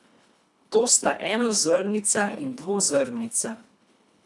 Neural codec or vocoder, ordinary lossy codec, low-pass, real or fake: codec, 24 kHz, 1.5 kbps, HILCodec; none; none; fake